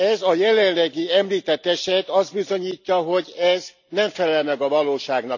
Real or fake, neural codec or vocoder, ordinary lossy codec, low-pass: real; none; none; 7.2 kHz